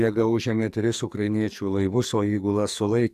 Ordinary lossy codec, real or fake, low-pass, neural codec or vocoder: AAC, 96 kbps; fake; 14.4 kHz; codec, 44.1 kHz, 2.6 kbps, SNAC